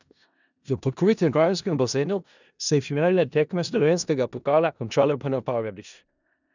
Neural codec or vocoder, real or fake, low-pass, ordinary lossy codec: codec, 16 kHz in and 24 kHz out, 0.4 kbps, LongCat-Audio-Codec, four codebook decoder; fake; 7.2 kHz; none